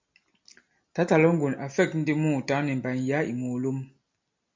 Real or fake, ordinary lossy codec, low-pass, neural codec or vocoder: real; MP3, 64 kbps; 7.2 kHz; none